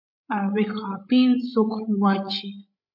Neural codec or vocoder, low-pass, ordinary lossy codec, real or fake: codec, 16 kHz, 16 kbps, FreqCodec, larger model; 5.4 kHz; MP3, 48 kbps; fake